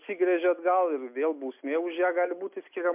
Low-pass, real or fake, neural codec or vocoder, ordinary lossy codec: 3.6 kHz; real; none; MP3, 32 kbps